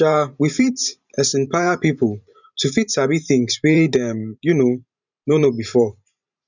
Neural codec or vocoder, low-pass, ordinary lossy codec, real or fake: vocoder, 44.1 kHz, 128 mel bands every 512 samples, BigVGAN v2; 7.2 kHz; none; fake